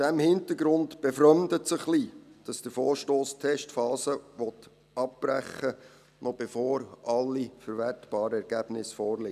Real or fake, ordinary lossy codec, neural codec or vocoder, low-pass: real; none; none; 14.4 kHz